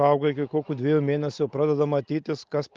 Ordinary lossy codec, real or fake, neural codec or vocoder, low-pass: Opus, 32 kbps; real; none; 7.2 kHz